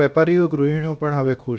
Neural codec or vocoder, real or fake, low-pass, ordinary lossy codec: codec, 16 kHz, about 1 kbps, DyCAST, with the encoder's durations; fake; none; none